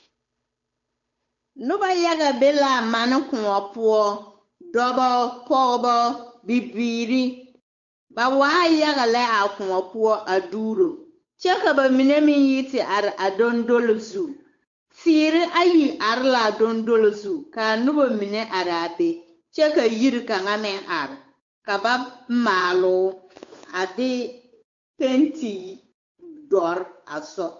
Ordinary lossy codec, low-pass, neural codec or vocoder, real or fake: MP3, 48 kbps; 7.2 kHz; codec, 16 kHz, 8 kbps, FunCodec, trained on Chinese and English, 25 frames a second; fake